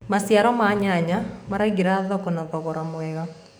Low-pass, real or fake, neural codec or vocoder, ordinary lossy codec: none; fake; codec, 44.1 kHz, 7.8 kbps, DAC; none